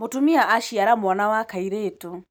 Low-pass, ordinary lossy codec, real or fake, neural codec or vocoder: none; none; real; none